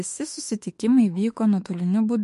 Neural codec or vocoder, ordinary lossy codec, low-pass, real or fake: autoencoder, 48 kHz, 32 numbers a frame, DAC-VAE, trained on Japanese speech; MP3, 48 kbps; 14.4 kHz; fake